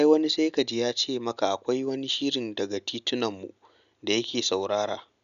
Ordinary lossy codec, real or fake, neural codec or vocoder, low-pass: none; real; none; 7.2 kHz